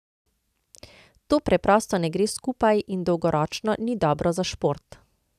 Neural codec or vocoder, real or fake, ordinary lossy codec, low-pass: none; real; none; 14.4 kHz